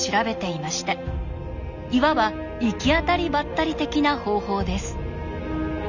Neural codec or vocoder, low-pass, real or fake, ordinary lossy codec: none; 7.2 kHz; real; none